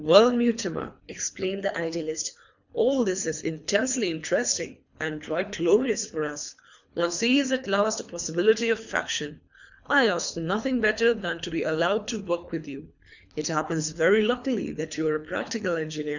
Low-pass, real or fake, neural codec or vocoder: 7.2 kHz; fake; codec, 24 kHz, 3 kbps, HILCodec